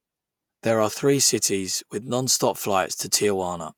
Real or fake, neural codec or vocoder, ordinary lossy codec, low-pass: fake; vocoder, 48 kHz, 128 mel bands, Vocos; none; none